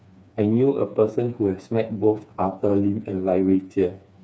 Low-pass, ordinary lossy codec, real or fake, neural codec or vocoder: none; none; fake; codec, 16 kHz, 4 kbps, FreqCodec, smaller model